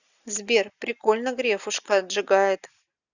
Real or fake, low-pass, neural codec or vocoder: real; 7.2 kHz; none